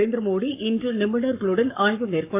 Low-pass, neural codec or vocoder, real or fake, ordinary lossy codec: 3.6 kHz; codec, 44.1 kHz, 7.8 kbps, Pupu-Codec; fake; AAC, 24 kbps